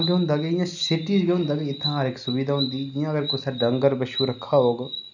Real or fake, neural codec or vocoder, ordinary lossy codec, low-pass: real; none; none; 7.2 kHz